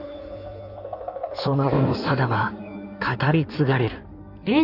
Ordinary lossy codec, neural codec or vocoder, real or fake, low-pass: none; codec, 16 kHz in and 24 kHz out, 1.1 kbps, FireRedTTS-2 codec; fake; 5.4 kHz